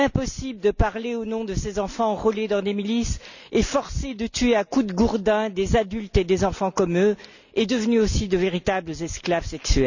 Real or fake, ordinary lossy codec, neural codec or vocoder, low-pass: real; none; none; 7.2 kHz